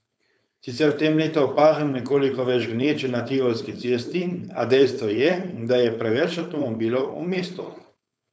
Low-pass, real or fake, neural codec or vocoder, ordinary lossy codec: none; fake; codec, 16 kHz, 4.8 kbps, FACodec; none